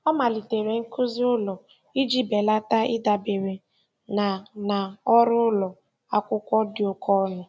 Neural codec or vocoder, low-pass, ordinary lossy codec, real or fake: none; none; none; real